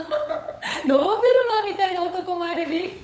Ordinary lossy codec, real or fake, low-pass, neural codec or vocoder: none; fake; none; codec, 16 kHz, 8 kbps, FunCodec, trained on LibriTTS, 25 frames a second